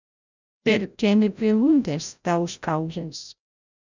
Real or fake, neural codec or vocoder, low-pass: fake; codec, 16 kHz, 0.5 kbps, FreqCodec, larger model; 7.2 kHz